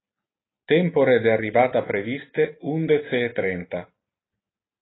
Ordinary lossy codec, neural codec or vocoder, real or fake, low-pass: AAC, 16 kbps; none; real; 7.2 kHz